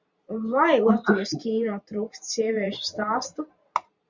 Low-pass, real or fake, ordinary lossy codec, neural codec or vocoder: 7.2 kHz; fake; Opus, 64 kbps; vocoder, 44.1 kHz, 128 mel bands every 512 samples, BigVGAN v2